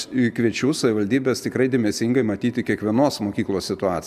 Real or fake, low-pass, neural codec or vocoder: real; 14.4 kHz; none